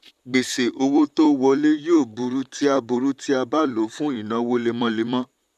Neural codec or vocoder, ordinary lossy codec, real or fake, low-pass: vocoder, 44.1 kHz, 128 mel bands, Pupu-Vocoder; MP3, 96 kbps; fake; 14.4 kHz